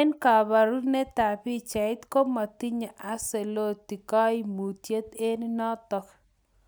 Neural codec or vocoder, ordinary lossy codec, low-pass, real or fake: none; none; none; real